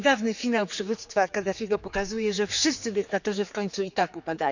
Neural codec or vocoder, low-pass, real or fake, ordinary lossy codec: codec, 16 kHz, 4 kbps, X-Codec, HuBERT features, trained on general audio; 7.2 kHz; fake; none